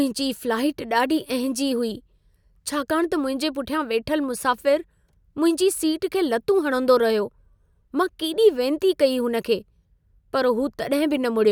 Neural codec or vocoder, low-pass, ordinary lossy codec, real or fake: none; none; none; real